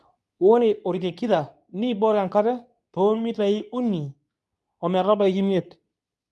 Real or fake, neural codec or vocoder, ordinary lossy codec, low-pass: fake; codec, 24 kHz, 0.9 kbps, WavTokenizer, medium speech release version 2; none; none